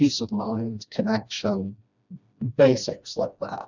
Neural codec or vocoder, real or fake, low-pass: codec, 16 kHz, 1 kbps, FreqCodec, smaller model; fake; 7.2 kHz